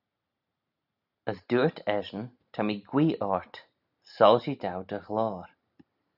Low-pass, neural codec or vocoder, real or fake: 5.4 kHz; none; real